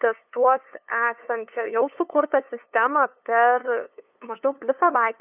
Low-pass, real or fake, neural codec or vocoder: 3.6 kHz; fake; codec, 16 kHz, 2 kbps, FunCodec, trained on LibriTTS, 25 frames a second